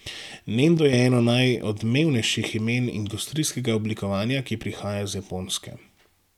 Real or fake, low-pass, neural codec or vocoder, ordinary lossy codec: real; 19.8 kHz; none; none